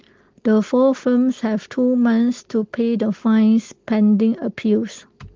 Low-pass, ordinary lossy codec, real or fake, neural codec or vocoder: 7.2 kHz; Opus, 16 kbps; real; none